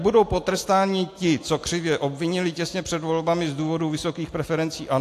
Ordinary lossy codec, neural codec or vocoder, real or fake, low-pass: AAC, 64 kbps; none; real; 14.4 kHz